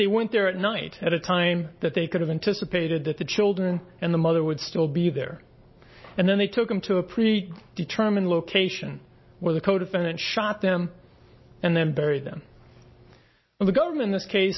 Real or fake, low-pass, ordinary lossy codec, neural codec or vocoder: real; 7.2 kHz; MP3, 24 kbps; none